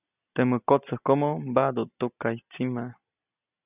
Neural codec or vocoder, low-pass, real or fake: none; 3.6 kHz; real